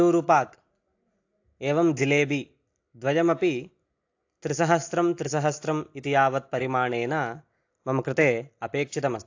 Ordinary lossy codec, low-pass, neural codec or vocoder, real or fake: AAC, 48 kbps; 7.2 kHz; none; real